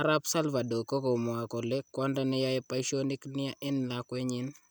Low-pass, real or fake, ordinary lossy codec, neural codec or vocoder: none; real; none; none